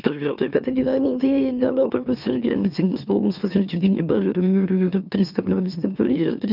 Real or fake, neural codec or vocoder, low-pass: fake; autoencoder, 44.1 kHz, a latent of 192 numbers a frame, MeloTTS; 5.4 kHz